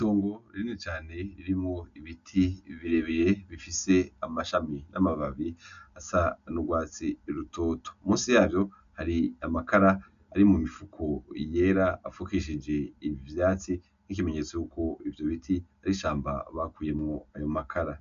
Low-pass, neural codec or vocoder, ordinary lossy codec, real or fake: 7.2 kHz; none; AAC, 96 kbps; real